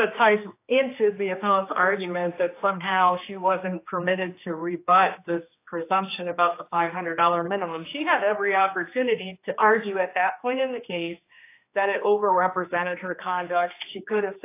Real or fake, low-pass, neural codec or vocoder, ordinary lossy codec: fake; 3.6 kHz; codec, 16 kHz, 2 kbps, X-Codec, HuBERT features, trained on general audio; AAC, 24 kbps